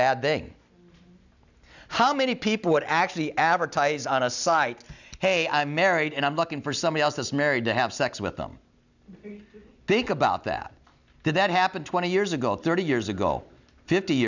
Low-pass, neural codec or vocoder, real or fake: 7.2 kHz; none; real